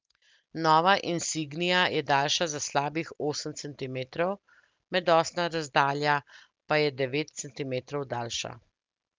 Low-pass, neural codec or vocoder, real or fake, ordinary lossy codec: 7.2 kHz; none; real; Opus, 24 kbps